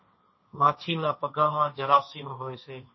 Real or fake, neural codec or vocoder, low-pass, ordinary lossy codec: fake; codec, 16 kHz, 1.1 kbps, Voila-Tokenizer; 7.2 kHz; MP3, 24 kbps